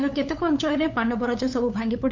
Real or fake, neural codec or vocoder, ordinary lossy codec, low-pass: fake; codec, 16 kHz, 8 kbps, FunCodec, trained on LibriTTS, 25 frames a second; MP3, 64 kbps; 7.2 kHz